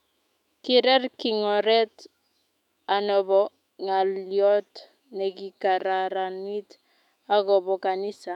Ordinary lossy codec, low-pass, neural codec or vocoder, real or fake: none; 19.8 kHz; autoencoder, 48 kHz, 128 numbers a frame, DAC-VAE, trained on Japanese speech; fake